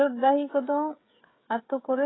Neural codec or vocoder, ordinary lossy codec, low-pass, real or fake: codec, 16 kHz, 16 kbps, FreqCodec, smaller model; AAC, 16 kbps; 7.2 kHz; fake